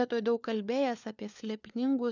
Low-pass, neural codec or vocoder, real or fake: 7.2 kHz; codec, 16 kHz, 4 kbps, FunCodec, trained on LibriTTS, 50 frames a second; fake